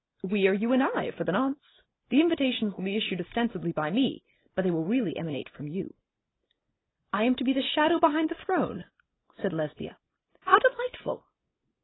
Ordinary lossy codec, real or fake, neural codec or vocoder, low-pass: AAC, 16 kbps; real; none; 7.2 kHz